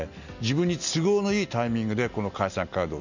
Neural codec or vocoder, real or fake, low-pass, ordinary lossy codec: none; real; 7.2 kHz; none